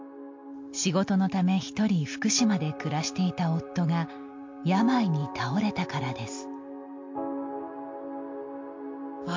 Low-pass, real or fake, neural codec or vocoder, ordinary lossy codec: 7.2 kHz; real; none; MP3, 48 kbps